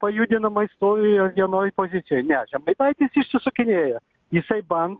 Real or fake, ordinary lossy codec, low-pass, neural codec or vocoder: real; Opus, 32 kbps; 7.2 kHz; none